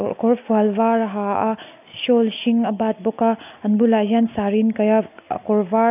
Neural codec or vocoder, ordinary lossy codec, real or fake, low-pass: none; none; real; 3.6 kHz